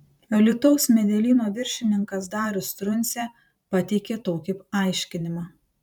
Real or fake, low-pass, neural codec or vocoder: fake; 19.8 kHz; vocoder, 44.1 kHz, 128 mel bands every 512 samples, BigVGAN v2